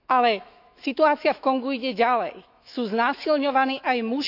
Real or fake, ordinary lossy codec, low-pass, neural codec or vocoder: fake; none; 5.4 kHz; autoencoder, 48 kHz, 128 numbers a frame, DAC-VAE, trained on Japanese speech